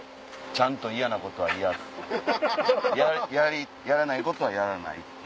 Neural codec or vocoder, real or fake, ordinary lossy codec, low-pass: none; real; none; none